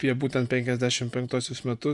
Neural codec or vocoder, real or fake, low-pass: vocoder, 24 kHz, 100 mel bands, Vocos; fake; 10.8 kHz